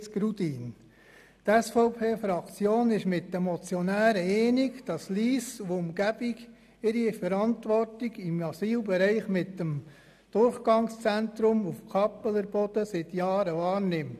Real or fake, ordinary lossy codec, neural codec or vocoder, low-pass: real; none; none; 14.4 kHz